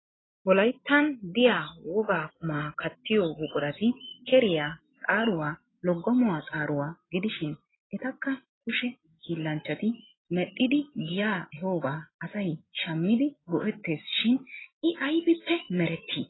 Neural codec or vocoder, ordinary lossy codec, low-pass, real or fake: none; AAC, 16 kbps; 7.2 kHz; real